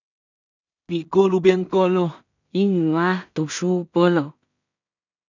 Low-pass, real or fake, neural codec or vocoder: 7.2 kHz; fake; codec, 16 kHz in and 24 kHz out, 0.4 kbps, LongCat-Audio-Codec, two codebook decoder